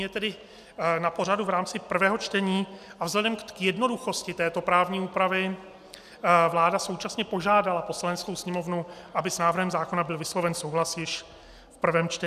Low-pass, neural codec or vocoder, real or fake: 14.4 kHz; none; real